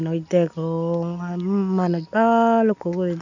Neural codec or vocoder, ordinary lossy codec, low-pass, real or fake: none; none; 7.2 kHz; real